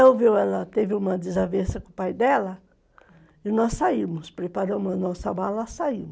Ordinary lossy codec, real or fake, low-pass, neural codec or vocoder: none; real; none; none